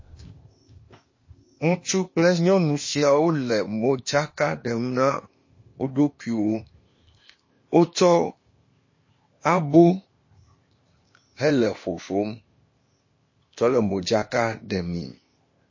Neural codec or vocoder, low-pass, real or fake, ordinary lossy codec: codec, 16 kHz, 0.8 kbps, ZipCodec; 7.2 kHz; fake; MP3, 32 kbps